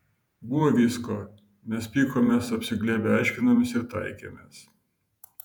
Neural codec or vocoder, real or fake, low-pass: vocoder, 48 kHz, 128 mel bands, Vocos; fake; 19.8 kHz